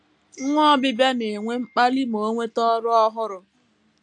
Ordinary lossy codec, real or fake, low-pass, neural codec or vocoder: AAC, 64 kbps; real; 10.8 kHz; none